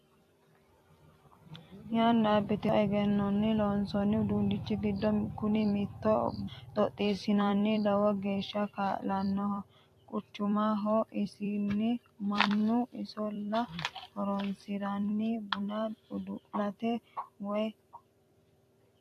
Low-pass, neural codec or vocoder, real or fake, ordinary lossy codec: 14.4 kHz; vocoder, 44.1 kHz, 128 mel bands every 256 samples, BigVGAN v2; fake; AAC, 64 kbps